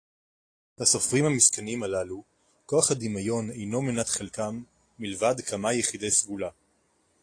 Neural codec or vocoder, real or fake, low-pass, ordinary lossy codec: none; real; 9.9 kHz; AAC, 48 kbps